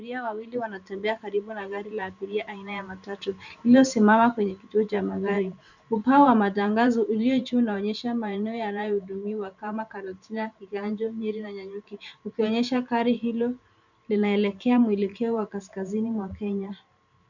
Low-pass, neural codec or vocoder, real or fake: 7.2 kHz; vocoder, 44.1 kHz, 128 mel bands every 512 samples, BigVGAN v2; fake